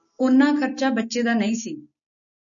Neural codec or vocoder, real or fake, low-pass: none; real; 7.2 kHz